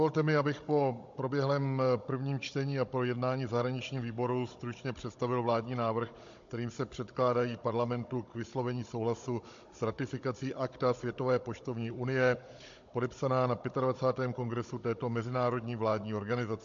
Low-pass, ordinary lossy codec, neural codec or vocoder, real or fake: 7.2 kHz; MP3, 48 kbps; codec, 16 kHz, 16 kbps, FunCodec, trained on Chinese and English, 50 frames a second; fake